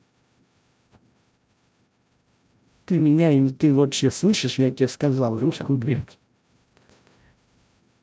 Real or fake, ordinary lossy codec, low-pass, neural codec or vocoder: fake; none; none; codec, 16 kHz, 0.5 kbps, FreqCodec, larger model